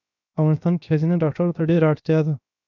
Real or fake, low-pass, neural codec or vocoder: fake; 7.2 kHz; codec, 16 kHz, 0.7 kbps, FocalCodec